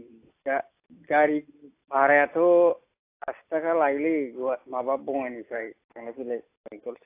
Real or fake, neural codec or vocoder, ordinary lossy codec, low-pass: real; none; AAC, 24 kbps; 3.6 kHz